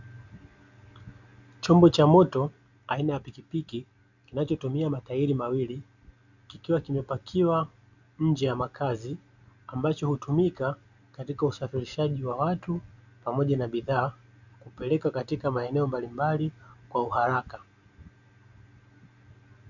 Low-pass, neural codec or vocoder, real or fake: 7.2 kHz; none; real